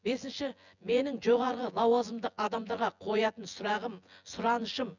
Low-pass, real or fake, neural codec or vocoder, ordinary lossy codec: 7.2 kHz; fake; vocoder, 24 kHz, 100 mel bands, Vocos; none